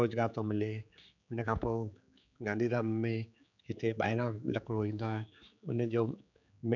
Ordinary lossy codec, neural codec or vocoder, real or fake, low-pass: none; codec, 16 kHz, 4 kbps, X-Codec, HuBERT features, trained on general audio; fake; 7.2 kHz